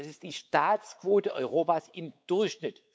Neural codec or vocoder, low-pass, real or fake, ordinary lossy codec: codec, 16 kHz, 4 kbps, X-Codec, WavLM features, trained on Multilingual LibriSpeech; none; fake; none